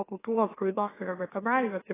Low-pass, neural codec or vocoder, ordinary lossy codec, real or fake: 3.6 kHz; autoencoder, 44.1 kHz, a latent of 192 numbers a frame, MeloTTS; AAC, 16 kbps; fake